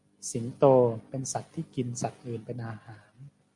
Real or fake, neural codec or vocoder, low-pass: real; none; 10.8 kHz